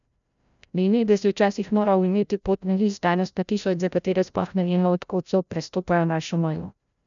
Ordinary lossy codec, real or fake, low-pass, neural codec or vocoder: none; fake; 7.2 kHz; codec, 16 kHz, 0.5 kbps, FreqCodec, larger model